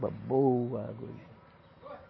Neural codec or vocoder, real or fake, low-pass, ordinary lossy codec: none; real; 7.2 kHz; MP3, 24 kbps